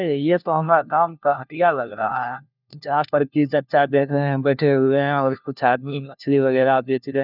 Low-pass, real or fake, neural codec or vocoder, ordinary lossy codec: 5.4 kHz; fake; codec, 16 kHz, 1 kbps, FunCodec, trained on LibriTTS, 50 frames a second; none